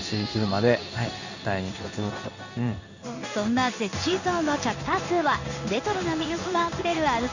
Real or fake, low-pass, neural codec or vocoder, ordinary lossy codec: fake; 7.2 kHz; codec, 16 kHz in and 24 kHz out, 1 kbps, XY-Tokenizer; none